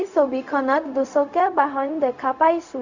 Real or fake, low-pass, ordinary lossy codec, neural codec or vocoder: fake; 7.2 kHz; none; codec, 16 kHz, 0.4 kbps, LongCat-Audio-Codec